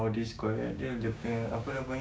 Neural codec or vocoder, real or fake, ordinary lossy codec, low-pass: codec, 16 kHz, 6 kbps, DAC; fake; none; none